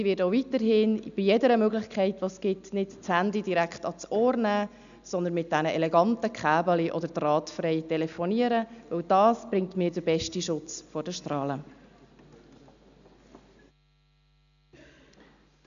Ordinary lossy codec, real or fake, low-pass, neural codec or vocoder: none; real; 7.2 kHz; none